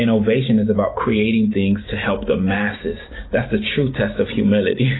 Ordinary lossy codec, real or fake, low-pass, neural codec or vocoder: AAC, 16 kbps; real; 7.2 kHz; none